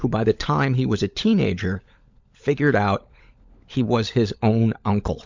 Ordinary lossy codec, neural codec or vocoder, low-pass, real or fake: MP3, 64 kbps; codec, 16 kHz, 16 kbps, FunCodec, trained on LibriTTS, 50 frames a second; 7.2 kHz; fake